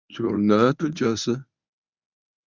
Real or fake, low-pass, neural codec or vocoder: fake; 7.2 kHz; codec, 24 kHz, 0.9 kbps, WavTokenizer, medium speech release version 1